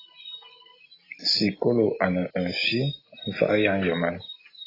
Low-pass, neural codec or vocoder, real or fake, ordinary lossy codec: 5.4 kHz; none; real; AAC, 24 kbps